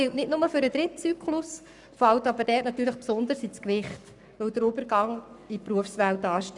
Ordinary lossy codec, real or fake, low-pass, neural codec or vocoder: none; fake; 10.8 kHz; codec, 44.1 kHz, 7.8 kbps, Pupu-Codec